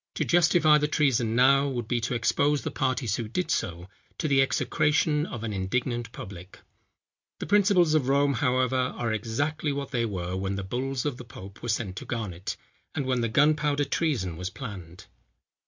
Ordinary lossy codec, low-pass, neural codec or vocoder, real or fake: MP3, 64 kbps; 7.2 kHz; none; real